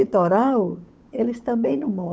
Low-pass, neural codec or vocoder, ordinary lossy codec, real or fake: none; codec, 16 kHz, 8 kbps, FunCodec, trained on Chinese and English, 25 frames a second; none; fake